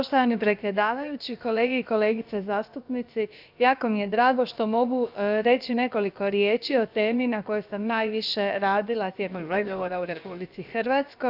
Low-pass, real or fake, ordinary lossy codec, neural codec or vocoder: 5.4 kHz; fake; none; codec, 16 kHz, about 1 kbps, DyCAST, with the encoder's durations